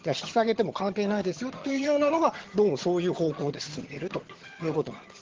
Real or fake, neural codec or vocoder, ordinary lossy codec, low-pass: fake; vocoder, 22.05 kHz, 80 mel bands, HiFi-GAN; Opus, 16 kbps; 7.2 kHz